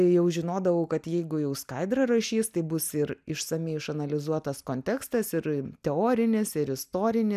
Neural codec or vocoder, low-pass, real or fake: none; 14.4 kHz; real